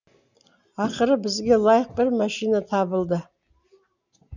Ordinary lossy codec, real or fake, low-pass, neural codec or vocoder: none; real; 7.2 kHz; none